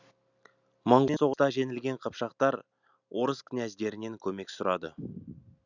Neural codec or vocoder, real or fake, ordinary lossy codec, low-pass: none; real; none; 7.2 kHz